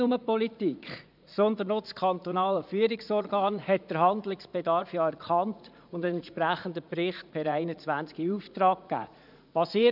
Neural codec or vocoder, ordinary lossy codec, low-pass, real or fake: vocoder, 44.1 kHz, 80 mel bands, Vocos; none; 5.4 kHz; fake